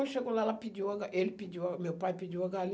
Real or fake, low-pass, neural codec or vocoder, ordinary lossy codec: real; none; none; none